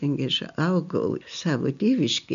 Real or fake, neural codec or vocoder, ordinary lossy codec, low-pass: real; none; MP3, 96 kbps; 7.2 kHz